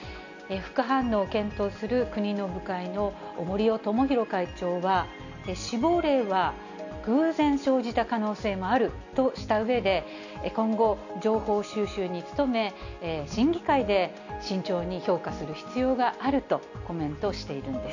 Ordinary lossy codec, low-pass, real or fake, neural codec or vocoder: none; 7.2 kHz; real; none